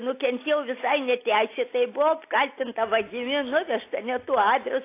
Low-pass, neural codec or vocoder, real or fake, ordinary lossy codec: 3.6 kHz; none; real; AAC, 24 kbps